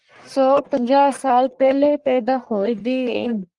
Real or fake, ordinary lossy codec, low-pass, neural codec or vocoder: fake; Opus, 24 kbps; 10.8 kHz; codec, 44.1 kHz, 1.7 kbps, Pupu-Codec